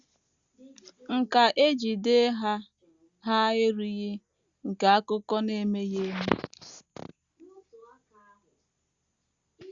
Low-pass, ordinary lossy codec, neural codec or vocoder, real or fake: 7.2 kHz; Opus, 64 kbps; none; real